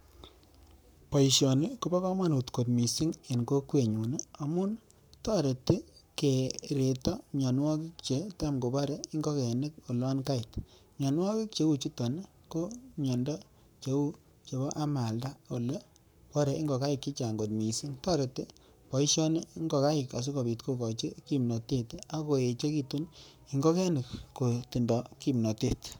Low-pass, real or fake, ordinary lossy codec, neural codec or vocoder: none; fake; none; codec, 44.1 kHz, 7.8 kbps, Pupu-Codec